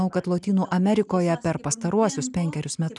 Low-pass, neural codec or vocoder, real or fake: 10.8 kHz; none; real